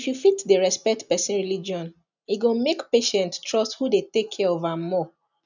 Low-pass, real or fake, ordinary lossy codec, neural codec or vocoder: 7.2 kHz; real; none; none